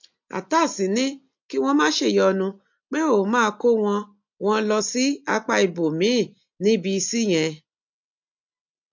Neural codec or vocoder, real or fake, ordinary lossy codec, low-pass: none; real; MP3, 48 kbps; 7.2 kHz